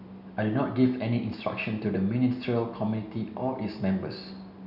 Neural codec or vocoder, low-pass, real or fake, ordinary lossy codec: none; 5.4 kHz; real; none